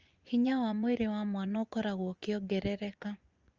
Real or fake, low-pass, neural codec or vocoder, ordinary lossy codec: fake; 7.2 kHz; vocoder, 24 kHz, 100 mel bands, Vocos; Opus, 32 kbps